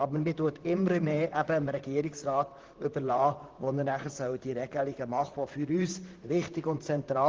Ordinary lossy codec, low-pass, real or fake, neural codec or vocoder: Opus, 16 kbps; 7.2 kHz; fake; vocoder, 44.1 kHz, 128 mel bands, Pupu-Vocoder